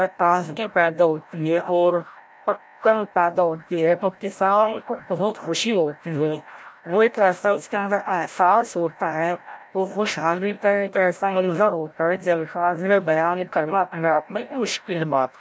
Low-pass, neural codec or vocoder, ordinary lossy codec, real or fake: none; codec, 16 kHz, 0.5 kbps, FreqCodec, larger model; none; fake